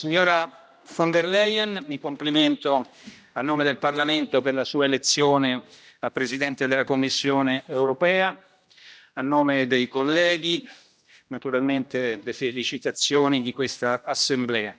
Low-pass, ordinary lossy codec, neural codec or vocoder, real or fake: none; none; codec, 16 kHz, 1 kbps, X-Codec, HuBERT features, trained on general audio; fake